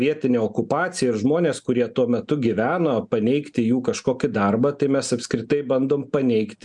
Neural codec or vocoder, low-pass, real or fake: none; 10.8 kHz; real